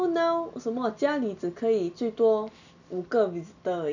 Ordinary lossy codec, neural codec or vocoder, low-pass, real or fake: none; none; 7.2 kHz; real